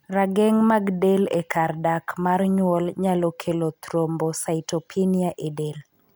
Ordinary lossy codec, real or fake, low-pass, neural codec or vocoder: none; real; none; none